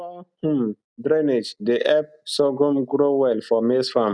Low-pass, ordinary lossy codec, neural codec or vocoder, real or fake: 9.9 kHz; none; none; real